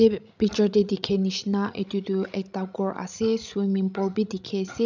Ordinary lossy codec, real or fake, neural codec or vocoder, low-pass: none; fake; codec, 16 kHz, 16 kbps, FreqCodec, larger model; 7.2 kHz